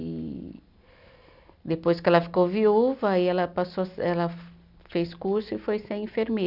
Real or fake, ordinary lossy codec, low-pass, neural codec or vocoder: real; none; 5.4 kHz; none